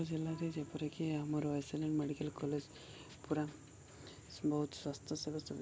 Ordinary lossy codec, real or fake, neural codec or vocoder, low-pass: none; real; none; none